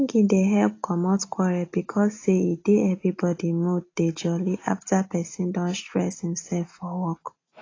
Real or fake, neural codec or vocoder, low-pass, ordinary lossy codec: real; none; 7.2 kHz; AAC, 32 kbps